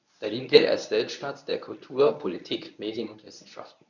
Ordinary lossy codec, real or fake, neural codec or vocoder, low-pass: none; fake; codec, 24 kHz, 0.9 kbps, WavTokenizer, medium speech release version 1; 7.2 kHz